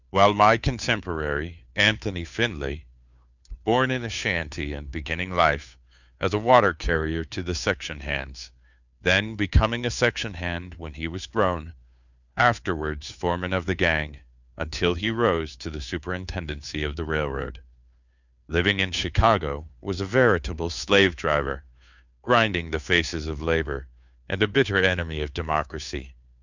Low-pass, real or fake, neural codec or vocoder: 7.2 kHz; fake; codec, 16 kHz, 2 kbps, FunCodec, trained on Chinese and English, 25 frames a second